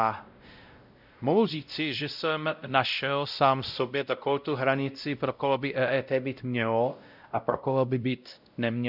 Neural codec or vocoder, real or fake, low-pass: codec, 16 kHz, 0.5 kbps, X-Codec, WavLM features, trained on Multilingual LibriSpeech; fake; 5.4 kHz